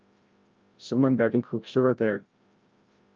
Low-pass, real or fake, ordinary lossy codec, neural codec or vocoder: 7.2 kHz; fake; Opus, 24 kbps; codec, 16 kHz, 0.5 kbps, FreqCodec, larger model